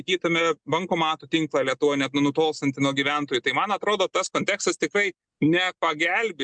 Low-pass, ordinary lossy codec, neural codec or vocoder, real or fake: 9.9 kHz; MP3, 96 kbps; none; real